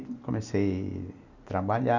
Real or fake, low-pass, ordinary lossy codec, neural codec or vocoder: real; 7.2 kHz; none; none